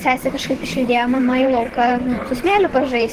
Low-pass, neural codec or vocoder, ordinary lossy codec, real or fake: 14.4 kHz; vocoder, 44.1 kHz, 128 mel bands, Pupu-Vocoder; Opus, 16 kbps; fake